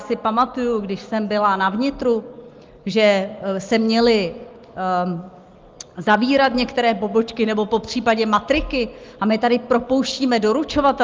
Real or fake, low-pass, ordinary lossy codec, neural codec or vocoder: real; 7.2 kHz; Opus, 32 kbps; none